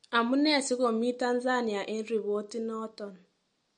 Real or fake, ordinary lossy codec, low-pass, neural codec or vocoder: real; MP3, 48 kbps; 19.8 kHz; none